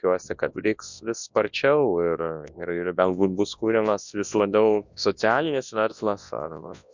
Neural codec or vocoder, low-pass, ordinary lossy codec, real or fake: codec, 24 kHz, 0.9 kbps, WavTokenizer, large speech release; 7.2 kHz; MP3, 64 kbps; fake